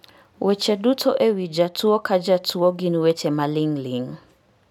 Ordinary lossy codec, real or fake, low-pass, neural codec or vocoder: none; real; 19.8 kHz; none